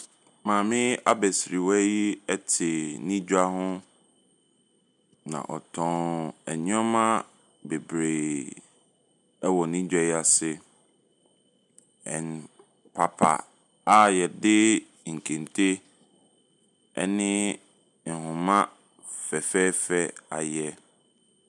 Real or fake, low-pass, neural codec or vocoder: real; 10.8 kHz; none